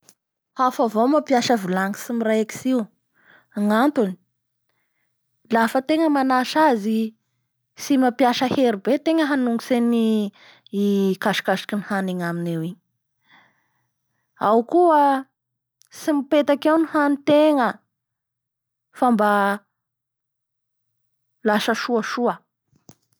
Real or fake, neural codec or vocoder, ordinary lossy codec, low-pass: real; none; none; none